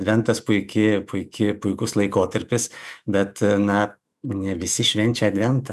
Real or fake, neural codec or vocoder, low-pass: fake; autoencoder, 48 kHz, 128 numbers a frame, DAC-VAE, trained on Japanese speech; 14.4 kHz